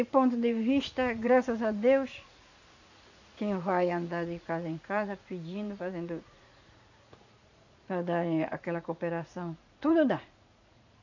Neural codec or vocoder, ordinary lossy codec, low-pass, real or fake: vocoder, 44.1 kHz, 80 mel bands, Vocos; none; 7.2 kHz; fake